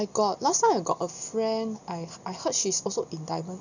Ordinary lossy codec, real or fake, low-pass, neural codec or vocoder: none; real; 7.2 kHz; none